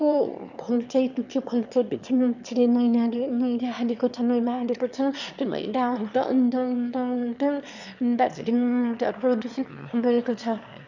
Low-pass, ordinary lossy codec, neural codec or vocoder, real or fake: 7.2 kHz; none; autoencoder, 22.05 kHz, a latent of 192 numbers a frame, VITS, trained on one speaker; fake